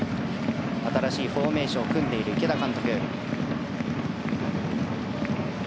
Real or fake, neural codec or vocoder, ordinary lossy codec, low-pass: real; none; none; none